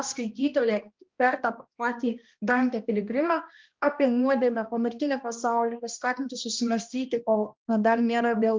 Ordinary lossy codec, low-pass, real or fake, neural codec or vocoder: Opus, 32 kbps; 7.2 kHz; fake; codec, 16 kHz, 1 kbps, X-Codec, HuBERT features, trained on balanced general audio